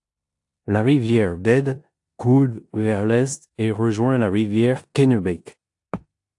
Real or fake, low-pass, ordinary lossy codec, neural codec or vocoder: fake; 10.8 kHz; AAC, 64 kbps; codec, 16 kHz in and 24 kHz out, 0.9 kbps, LongCat-Audio-Codec, four codebook decoder